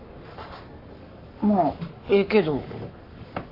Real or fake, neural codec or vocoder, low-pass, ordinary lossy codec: fake; codec, 44.1 kHz, 7.8 kbps, Pupu-Codec; 5.4 kHz; AAC, 32 kbps